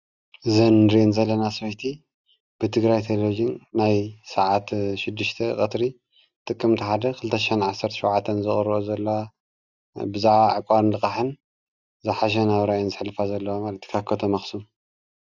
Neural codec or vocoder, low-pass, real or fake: none; 7.2 kHz; real